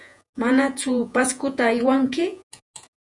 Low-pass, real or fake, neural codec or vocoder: 10.8 kHz; fake; vocoder, 48 kHz, 128 mel bands, Vocos